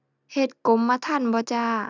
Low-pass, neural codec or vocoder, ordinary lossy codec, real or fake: 7.2 kHz; none; none; real